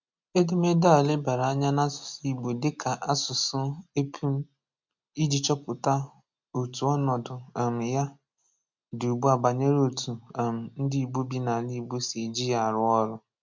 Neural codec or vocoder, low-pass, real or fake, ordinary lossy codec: none; 7.2 kHz; real; MP3, 64 kbps